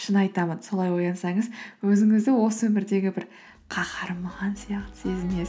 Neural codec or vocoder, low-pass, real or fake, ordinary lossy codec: none; none; real; none